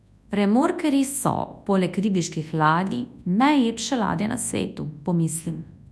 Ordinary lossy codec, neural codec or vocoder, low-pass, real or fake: none; codec, 24 kHz, 0.9 kbps, WavTokenizer, large speech release; none; fake